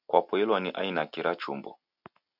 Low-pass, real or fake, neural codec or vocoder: 5.4 kHz; real; none